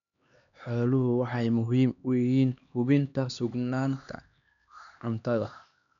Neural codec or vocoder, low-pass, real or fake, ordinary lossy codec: codec, 16 kHz, 2 kbps, X-Codec, HuBERT features, trained on LibriSpeech; 7.2 kHz; fake; none